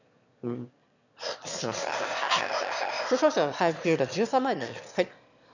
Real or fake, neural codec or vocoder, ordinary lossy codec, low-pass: fake; autoencoder, 22.05 kHz, a latent of 192 numbers a frame, VITS, trained on one speaker; none; 7.2 kHz